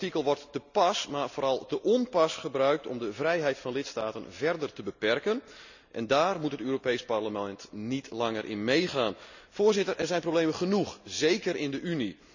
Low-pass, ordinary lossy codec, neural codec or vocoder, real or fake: 7.2 kHz; none; none; real